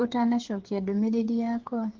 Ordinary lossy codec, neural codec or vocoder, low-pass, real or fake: Opus, 16 kbps; codec, 16 kHz, 8 kbps, FreqCodec, smaller model; 7.2 kHz; fake